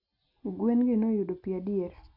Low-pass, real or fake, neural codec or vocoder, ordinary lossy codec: 5.4 kHz; real; none; none